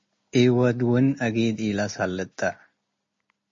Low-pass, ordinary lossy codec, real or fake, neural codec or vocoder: 7.2 kHz; MP3, 32 kbps; real; none